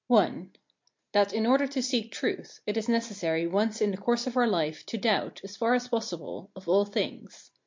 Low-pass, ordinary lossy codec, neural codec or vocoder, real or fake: 7.2 kHz; MP3, 48 kbps; none; real